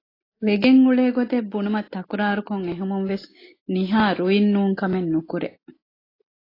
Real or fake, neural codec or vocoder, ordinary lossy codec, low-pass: real; none; AAC, 24 kbps; 5.4 kHz